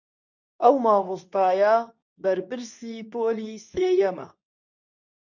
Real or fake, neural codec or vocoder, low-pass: fake; codec, 24 kHz, 0.9 kbps, WavTokenizer, medium speech release version 2; 7.2 kHz